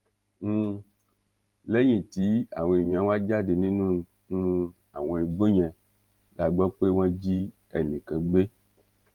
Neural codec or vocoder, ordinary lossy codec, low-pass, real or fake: vocoder, 44.1 kHz, 128 mel bands every 512 samples, BigVGAN v2; Opus, 32 kbps; 19.8 kHz; fake